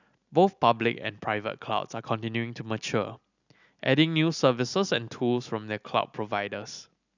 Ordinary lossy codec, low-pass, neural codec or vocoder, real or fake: none; 7.2 kHz; none; real